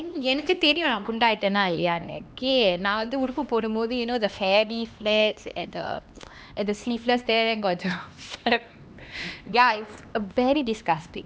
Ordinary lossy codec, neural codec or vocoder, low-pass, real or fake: none; codec, 16 kHz, 2 kbps, X-Codec, HuBERT features, trained on LibriSpeech; none; fake